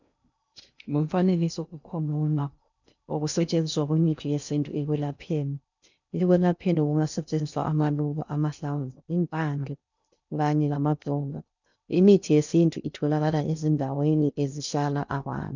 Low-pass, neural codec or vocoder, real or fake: 7.2 kHz; codec, 16 kHz in and 24 kHz out, 0.6 kbps, FocalCodec, streaming, 2048 codes; fake